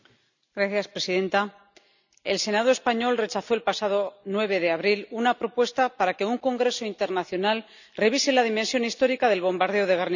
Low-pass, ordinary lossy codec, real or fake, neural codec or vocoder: 7.2 kHz; none; real; none